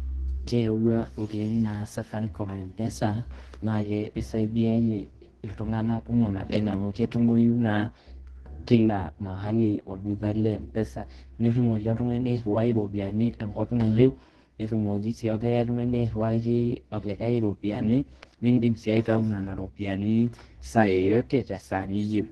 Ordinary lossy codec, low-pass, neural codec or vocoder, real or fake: Opus, 16 kbps; 10.8 kHz; codec, 24 kHz, 0.9 kbps, WavTokenizer, medium music audio release; fake